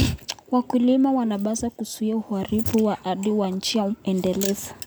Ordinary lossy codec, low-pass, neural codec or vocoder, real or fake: none; none; none; real